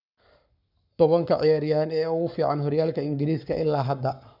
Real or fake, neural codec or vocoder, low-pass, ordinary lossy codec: fake; codec, 44.1 kHz, 7.8 kbps, Pupu-Codec; 5.4 kHz; none